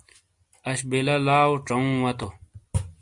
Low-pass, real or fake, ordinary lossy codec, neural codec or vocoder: 10.8 kHz; real; MP3, 64 kbps; none